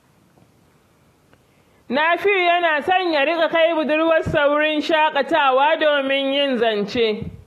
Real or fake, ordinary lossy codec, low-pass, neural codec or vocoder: real; AAC, 48 kbps; 14.4 kHz; none